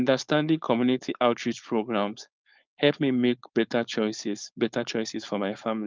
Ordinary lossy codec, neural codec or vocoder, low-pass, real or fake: Opus, 32 kbps; codec, 16 kHz, 4.8 kbps, FACodec; 7.2 kHz; fake